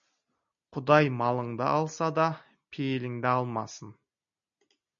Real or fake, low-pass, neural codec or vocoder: real; 7.2 kHz; none